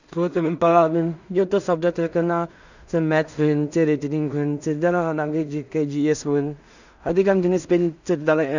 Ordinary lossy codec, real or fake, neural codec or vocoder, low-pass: none; fake; codec, 16 kHz in and 24 kHz out, 0.4 kbps, LongCat-Audio-Codec, two codebook decoder; 7.2 kHz